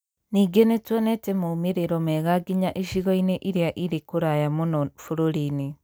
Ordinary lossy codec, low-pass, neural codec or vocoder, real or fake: none; none; none; real